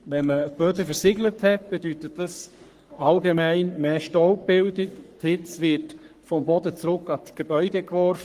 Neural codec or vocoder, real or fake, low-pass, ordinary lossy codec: codec, 44.1 kHz, 3.4 kbps, Pupu-Codec; fake; 14.4 kHz; Opus, 24 kbps